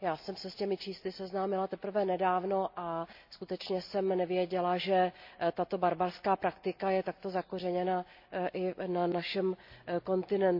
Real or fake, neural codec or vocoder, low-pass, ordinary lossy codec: real; none; 5.4 kHz; MP3, 48 kbps